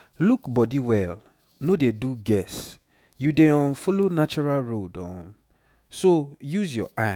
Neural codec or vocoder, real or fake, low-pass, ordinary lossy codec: autoencoder, 48 kHz, 128 numbers a frame, DAC-VAE, trained on Japanese speech; fake; 19.8 kHz; none